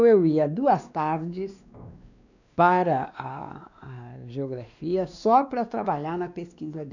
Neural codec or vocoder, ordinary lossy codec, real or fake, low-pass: codec, 16 kHz, 2 kbps, X-Codec, WavLM features, trained on Multilingual LibriSpeech; none; fake; 7.2 kHz